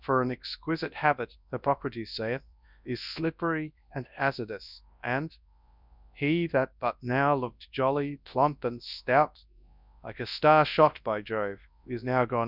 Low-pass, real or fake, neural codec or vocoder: 5.4 kHz; fake; codec, 24 kHz, 0.9 kbps, WavTokenizer, large speech release